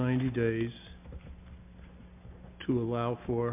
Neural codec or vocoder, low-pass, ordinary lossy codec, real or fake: none; 3.6 kHz; MP3, 32 kbps; real